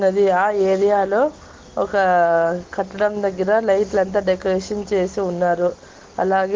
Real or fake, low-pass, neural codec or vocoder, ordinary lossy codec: real; 7.2 kHz; none; Opus, 16 kbps